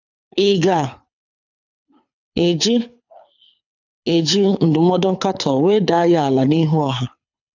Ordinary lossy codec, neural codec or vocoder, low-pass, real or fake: none; codec, 24 kHz, 6 kbps, HILCodec; 7.2 kHz; fake